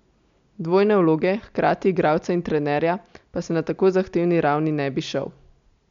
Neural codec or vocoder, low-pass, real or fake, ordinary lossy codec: none; 7.2 kHz; real; MP3, 64 kbps